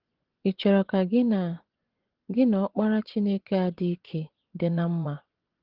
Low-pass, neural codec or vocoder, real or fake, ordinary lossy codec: 5.4 kHz; vocoder, 24 kHz, 100 mel bands, Vocos; fake; Opus, 16 kbps